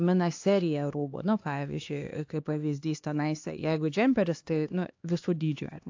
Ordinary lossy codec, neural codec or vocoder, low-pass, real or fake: AAC, 48 kbps; codec, 16 kHz, 2 kbps, X-Codec, HuBERT features, trained on LibriSpeech; 7.2 kHz; fake